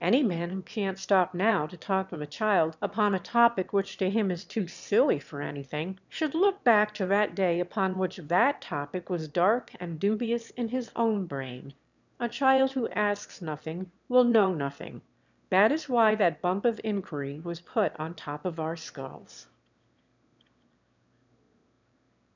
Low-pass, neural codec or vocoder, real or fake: 7.2 kHz; autoencoder, 22.05 kHz, a latent of 192 numbers a frame, VITS, trained on one speaker; fake